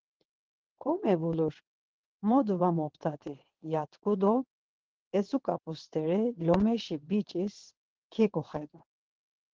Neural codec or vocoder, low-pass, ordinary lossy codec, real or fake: vocoder, 22.05 kHz, 80 mel bands, WaveNeXt; 7.2 kHz; Opus, 16 kbps; fake